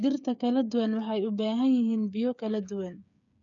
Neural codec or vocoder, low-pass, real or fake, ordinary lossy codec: codec, 16 kHz, 8 kbps, FreqCodec, smaller model; 7.2 kHz; fake; none